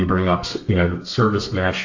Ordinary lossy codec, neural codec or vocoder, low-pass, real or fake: AAC, 48 kbps; codec, 32 kHz, 1.9 kbps, SNAC; 7.2 kHz; fake